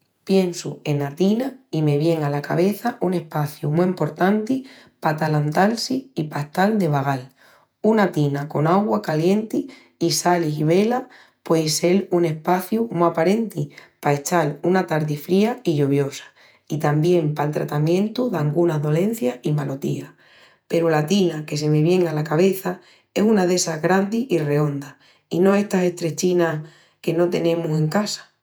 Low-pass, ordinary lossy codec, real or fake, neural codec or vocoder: none; none; fake; vocoder, 48 kHz, 128 mel bands, Vocos